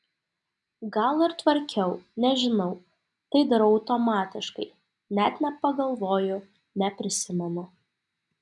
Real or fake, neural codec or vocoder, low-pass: real; none; 10.8 kHz